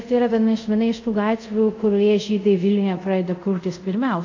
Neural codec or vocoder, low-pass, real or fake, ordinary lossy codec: codec, 24 kHz, 0.5 kbps, DualCodec; 7.2 kHz; fake; AAC, 48 kbps